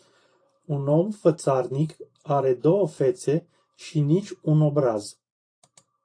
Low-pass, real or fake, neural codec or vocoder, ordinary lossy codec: 9.9 kHz; fake; vocoder, 44.1 kHz, 128 mel bands every 512 samples, BigVGAN v2; AAC, 48 kbps